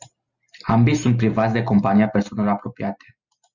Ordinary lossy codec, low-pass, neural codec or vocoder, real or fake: Opus, 64 kbps; 7.2 kHz; none; real